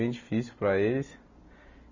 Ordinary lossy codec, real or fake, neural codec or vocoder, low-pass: none; real; none; 7.2 kHz